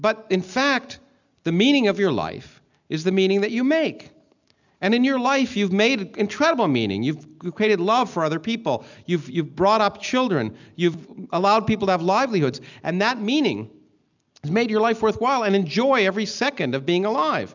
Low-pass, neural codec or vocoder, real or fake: 7.2 kHz; none; real